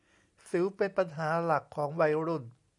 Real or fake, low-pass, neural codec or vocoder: real; 10.8 kHz; none